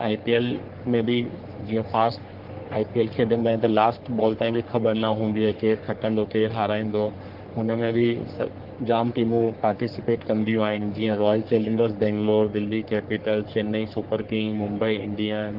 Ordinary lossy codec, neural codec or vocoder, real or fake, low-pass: Opus, 16 kbps; codec, 44.1 kHz, 3.4 kbps, Pupu-Codec; fake; 5.4 kHz